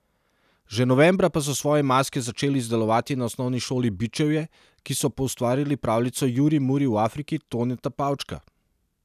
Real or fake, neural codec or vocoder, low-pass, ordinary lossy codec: real; none; 14.4 kHz; none